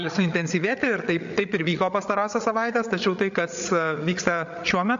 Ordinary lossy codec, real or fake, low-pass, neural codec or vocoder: AAC, 64 kbps; fake; 7.2 kHz; codec, 16 kHz, 8 kbps, FreqCodec, larger model